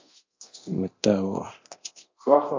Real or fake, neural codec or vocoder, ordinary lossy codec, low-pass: fake; codec, 24 kHz, 0.9 kbps, DualCodec; MP3, 48 kbps; 7.2 kHz